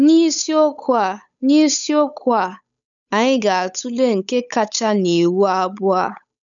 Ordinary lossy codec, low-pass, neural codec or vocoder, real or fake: none; 7.2 kHz; codec, 16 kHz, 8 kbps, FunCodec, trained on Chinese and English, 25 frames a second; fake